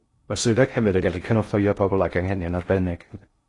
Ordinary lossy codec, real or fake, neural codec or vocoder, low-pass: AAC, 32 kbps; fake; codec, 16 kHz in and 24 kHz out, 0.6 kbps, FocalCodec, streaming, 2048 codes; 10.8 kHz